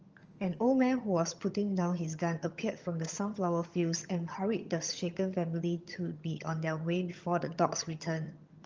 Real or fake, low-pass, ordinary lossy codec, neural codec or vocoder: fake; 7.2 kHz; Opus, 24 kbps; vocoder, 22.05 kHz, 80 mel bands, HiFi-GAN